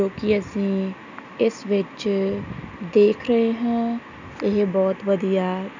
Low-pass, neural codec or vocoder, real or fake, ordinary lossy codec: 7.2 kHz; none; real; none